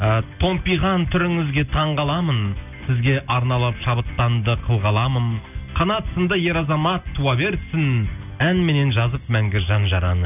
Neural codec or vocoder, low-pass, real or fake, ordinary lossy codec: none; 3.6 kHz; real; none